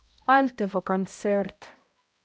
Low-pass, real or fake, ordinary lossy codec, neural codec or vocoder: none; fake; none; codec, 16 kHz, 0.5 kbps, X-Codec, HuBERT features, trained on balanced general audio